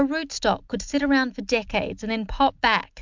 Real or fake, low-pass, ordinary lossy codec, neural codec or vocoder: real; 7.2 kHz; MP3, 64 kbps; none